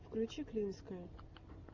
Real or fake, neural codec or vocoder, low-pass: real; none; 7.2 kHz